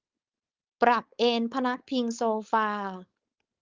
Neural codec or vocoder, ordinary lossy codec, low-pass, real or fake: codec, 16 kHz, 4.8 kbps, FACodec; Opus, 32 kbps; 7.2 kHz; fake